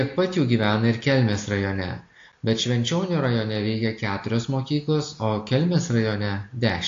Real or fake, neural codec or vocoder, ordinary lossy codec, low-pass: real; none; AAC, 48 kbps; 7.2 kHz